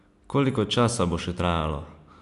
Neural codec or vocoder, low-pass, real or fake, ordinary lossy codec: none; 10.8 kHz; real; none